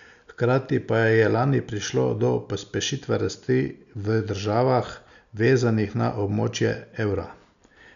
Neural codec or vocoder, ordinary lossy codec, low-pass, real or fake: none; none; 7.2 kHz; real